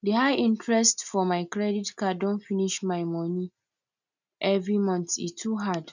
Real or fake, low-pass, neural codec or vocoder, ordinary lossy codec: real; 7.2 kHz; none; none